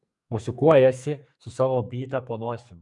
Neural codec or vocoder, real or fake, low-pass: codec, 44.1 kHz, 2.6 kbps, SNAC; fake; 10.8 kHz